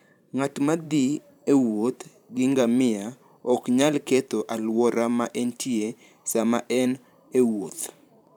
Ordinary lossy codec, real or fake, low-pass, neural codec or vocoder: none; fake; 19.8 kHz; vocoder, 44.1 kHz, 128 mel bands every 512 samples, BigVGAN v2